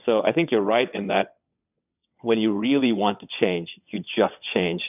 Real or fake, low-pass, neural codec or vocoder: fake; 3.6 kHz; vocoder, 22.05 kHz, 80 mel bands, WaveNeXt